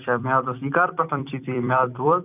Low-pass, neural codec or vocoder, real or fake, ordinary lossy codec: 3.6 kHz; vocoder, 44.1 kHz, 128 mel bands every 256 samples, BigVGAN v2; fake; none